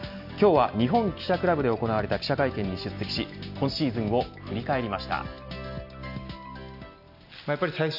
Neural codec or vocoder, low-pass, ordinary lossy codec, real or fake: none; 5.4 kHz; none; real